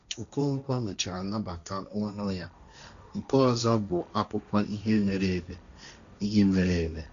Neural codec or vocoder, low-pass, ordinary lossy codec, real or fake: codec, 16 kHz, 1.1 kbps, Voila-Tokenizer; 7.2 kHz; none; fake